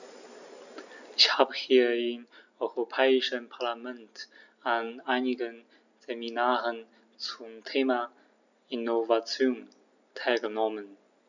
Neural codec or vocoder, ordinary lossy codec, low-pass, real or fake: none; none; 7.2 kHz; real